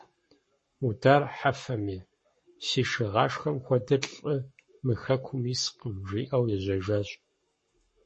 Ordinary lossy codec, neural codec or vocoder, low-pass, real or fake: MP3, 32 kbps; codec, 44.1 kHz, 7.8 kbps, Pupu-Codec; 10.8 kHz; fake